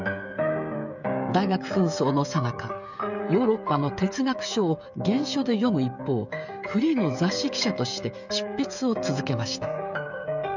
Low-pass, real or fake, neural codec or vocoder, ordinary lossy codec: 7.2 kHz; fake; codec, 16 kHz, 16 kbps, FreqCodec, smaller model; none